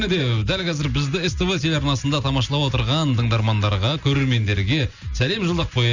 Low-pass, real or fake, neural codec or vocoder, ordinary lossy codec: 7.2 kHz; real; none; Opus, 64 kbps